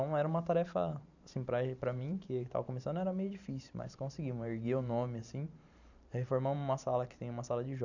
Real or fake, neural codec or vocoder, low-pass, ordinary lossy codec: fake; vocoder, 44.1 kHz, 128 mel bands every 512 samples, BigVGAN v2; 7.2 kHz; none